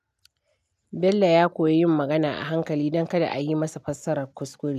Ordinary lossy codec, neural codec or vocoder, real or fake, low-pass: none; none; real; 14.4 kHz